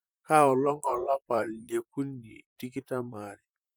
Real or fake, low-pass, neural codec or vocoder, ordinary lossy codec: fake; none; vocoder, 44.1 kHz, 128 mel bands, Pupu-Vocoder; none